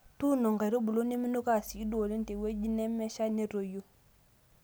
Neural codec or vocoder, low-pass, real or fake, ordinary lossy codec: none; none; real; none